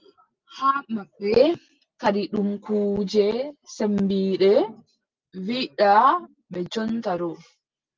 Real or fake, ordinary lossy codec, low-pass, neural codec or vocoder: real; Opus, 24 kbps; 7.2 kHz; none